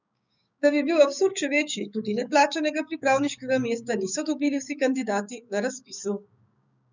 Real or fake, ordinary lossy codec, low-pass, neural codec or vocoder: fake; none; 7.2 kHz; codec, 16 kHz, 6 kbps, DAC